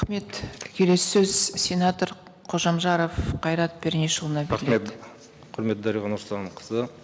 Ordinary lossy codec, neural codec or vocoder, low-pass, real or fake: none; none; none; real